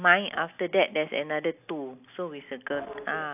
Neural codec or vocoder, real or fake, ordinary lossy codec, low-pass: none; real; AAC, 32 kbps; 3.6 kHz